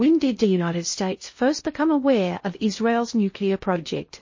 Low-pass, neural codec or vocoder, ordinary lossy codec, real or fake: 7.2 kHz; codec, 16 kHz in and 24 kHz out, 0.6 kbps, FocalCodec, streaming, 2048 codes; MP3, 32 kbps; fake